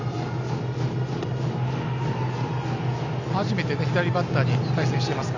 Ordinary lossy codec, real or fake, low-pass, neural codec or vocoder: none; real; 7.2 kHz; none